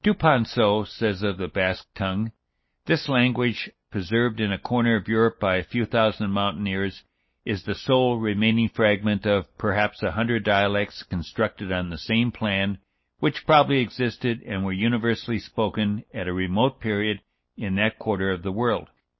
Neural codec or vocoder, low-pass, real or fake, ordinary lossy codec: none; 7.2 kHz; real; MP3, 24 kbps